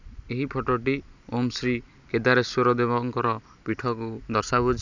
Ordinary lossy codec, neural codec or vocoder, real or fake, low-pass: none; none; real; 7.2 kHz